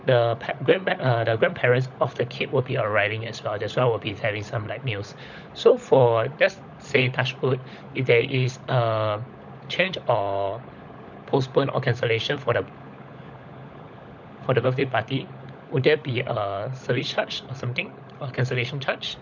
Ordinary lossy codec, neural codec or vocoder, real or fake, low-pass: none; codec, 16 kHz, 16 kbps, FunCodec, trained on LibriTTS, 50 frames a second; fake; 7.2 kHz